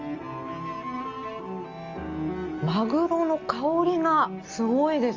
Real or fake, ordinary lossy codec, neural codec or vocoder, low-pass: real; Opus, 32 kbps; none; 7.2 kHz